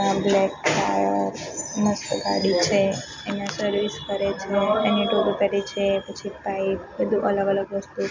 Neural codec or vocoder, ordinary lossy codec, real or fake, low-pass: none; MP3, 64 kbps; real; 7.2 kHz